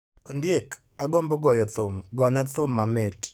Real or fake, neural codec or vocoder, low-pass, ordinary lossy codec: fake; codec, 44.1 kHz, 2.6 kbps, SNAC; none; none